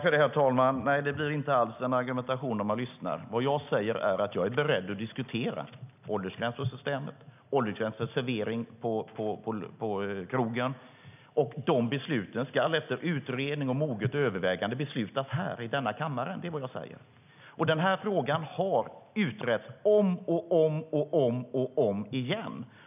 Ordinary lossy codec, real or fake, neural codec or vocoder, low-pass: none; real; none; 3.6 kHz